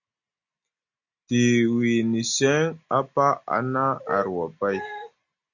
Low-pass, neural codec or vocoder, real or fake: 7.2 kHz; none; real